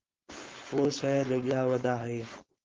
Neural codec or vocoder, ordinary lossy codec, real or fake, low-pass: codec, 16 kHz, 4.8 kbps, FACodec; Opus, 32 kbps; fake; 7.2 kHz